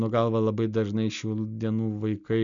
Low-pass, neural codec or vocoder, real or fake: 7.2 kHz; none; real